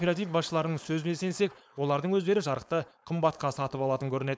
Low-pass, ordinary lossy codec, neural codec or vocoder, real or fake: none; none; codec, 16 kHz, 4.8 kbps, FACodec; fake